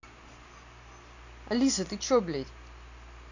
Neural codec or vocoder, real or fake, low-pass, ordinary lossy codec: none; real; 7.2 kHz; none